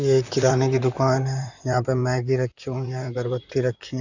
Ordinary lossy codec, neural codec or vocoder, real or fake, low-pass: none; vocoder, 44.1 kHz, 128 mel bands, Pupu-Vocoder; fake; 7.2 kHz